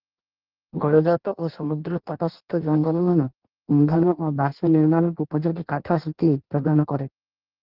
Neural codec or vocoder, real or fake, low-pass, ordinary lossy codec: codec, 16 kHz in and 24 kHz out, 0.6 kbps, FireRedTTS-2 codec; fake; 5.4 kHz; Opus, 16 kbps